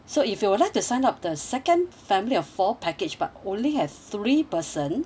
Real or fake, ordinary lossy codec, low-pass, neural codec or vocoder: real; none; none; none